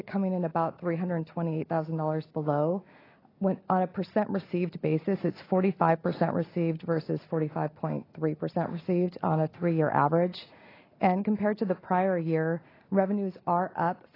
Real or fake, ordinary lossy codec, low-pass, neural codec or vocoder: real; AAC, 24 kbps; 5.4 kHz; none